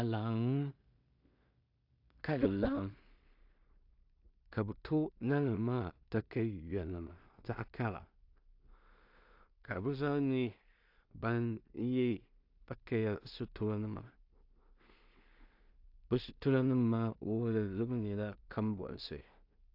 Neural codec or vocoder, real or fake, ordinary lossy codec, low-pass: codec, 16 kHz in and 24 kHz out, 0.4 kbps, LongCat-Audio-Codec, two codebook decoder; fake; AAC, 48 kbps; 5.4 kHz